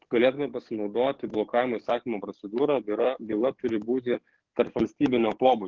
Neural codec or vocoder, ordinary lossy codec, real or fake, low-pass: codec, 44.1 kHz, 7.8 kbps, DAC; Opus, 16 kbps; fake; 7.2 kHz